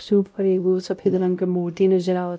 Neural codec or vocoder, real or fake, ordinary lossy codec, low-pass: codec, 16 kHz, 0.5 kbps, X-Codec, WavLM features, trained on Multilingual LibriSpeech; fake; none; none